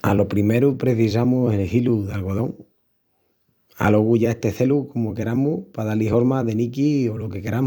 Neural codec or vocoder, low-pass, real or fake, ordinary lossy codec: vocoder, 44.1 kHz, 128 mel bands every 256 samples, BigVGAN v2; 19.8 kHz; fake; none